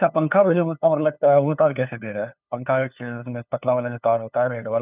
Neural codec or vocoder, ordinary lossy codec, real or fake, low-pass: codec, 16 kHz, 2 kbps, FunCodec, trained on LibriTTS, 25 frames a second; none; fake; 3.6 kHz